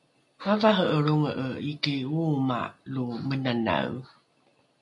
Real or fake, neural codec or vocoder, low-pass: real; none; 10.8 kHz